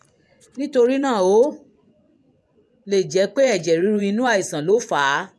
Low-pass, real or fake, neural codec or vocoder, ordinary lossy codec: none; fake; vocoder, 24 kHz, 100 mel bands, Vocos; none